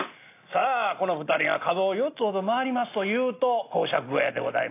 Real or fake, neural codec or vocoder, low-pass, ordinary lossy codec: real; none; 3.6 kHz; AAC, 24 kbps